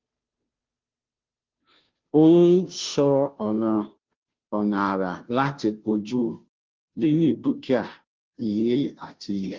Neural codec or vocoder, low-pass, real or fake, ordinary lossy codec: codec, 16 kHz, 0.5 kbps, FunCodec, trained on Chinese and English, 25 frames a second; 7.2 kHz; fake; Opus, 32 kbps